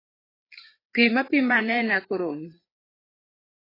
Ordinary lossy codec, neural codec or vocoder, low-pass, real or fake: AAC, 24 kbps; codec, 16 kHz, 4 kbps, FreqCodec, larger model; 5.4 kHz; fake